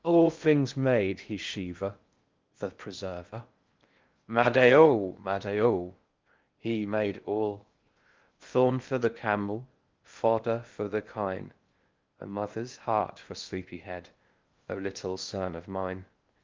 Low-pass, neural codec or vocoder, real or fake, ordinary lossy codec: 7.2 kHz; codec, 16 kHz in and 24 kHz out, 0.6 kbps, FocalCodec, streaming, 2048 codes; fake; Opus, 32 kbps